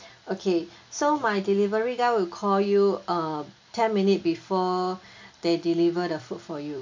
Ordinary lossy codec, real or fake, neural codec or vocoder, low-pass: MP3, 64 kbps; real; none; 7.2 kHz